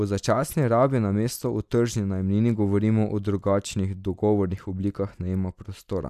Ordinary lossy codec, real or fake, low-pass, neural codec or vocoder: none; real; 14.4 kHz; none